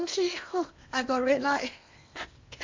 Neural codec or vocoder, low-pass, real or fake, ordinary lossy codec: codec, 16 kHz in and 24 kHz out, 0.8 kbps, FocalCodec, streaming, 65536 codes; 7.2 kHz; fake; MP3, 48 kbps